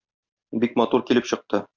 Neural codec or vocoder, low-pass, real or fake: none; 7.2 kHz; real